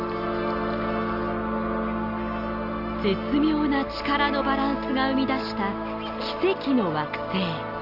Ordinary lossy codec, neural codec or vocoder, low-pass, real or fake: Opus, 32 kbps; none; 5.4 kHz; real